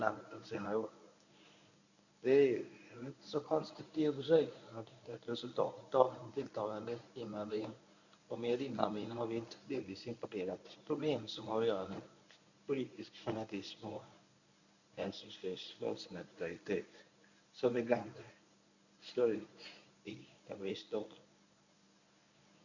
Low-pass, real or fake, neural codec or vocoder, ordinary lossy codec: 7.2 kHz; fake; codec, 24 kHz, 0.9 kbps, WavTokenizer, medium speech release version 1; none